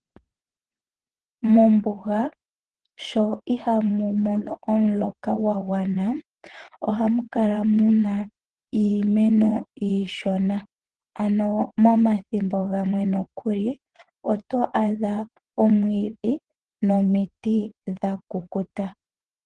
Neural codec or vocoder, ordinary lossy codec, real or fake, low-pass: vocoder, 22.05 kHz, 80 mel bands, WaveNeXt; Opus, 16 kbps; fake; 9.9 kHz